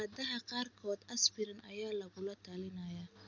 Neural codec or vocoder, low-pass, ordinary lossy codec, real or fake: none; 7.2 kHz; none; real